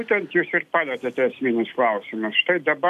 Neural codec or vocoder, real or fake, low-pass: none; real; 14.4 kHz